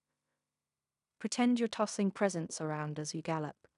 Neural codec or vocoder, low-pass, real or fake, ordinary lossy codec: codec, 16 kHz in and 24 kHz out, 0.9 kbps, LongCat-Audio-Codec, fine tuned four codebook decoder; 10.8 kHz; fake; none